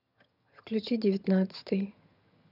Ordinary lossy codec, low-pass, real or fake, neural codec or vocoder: none; 5.4 kHz; fake; vocoder, 22.05 kHz, 80 mel bands, HiFi-GAN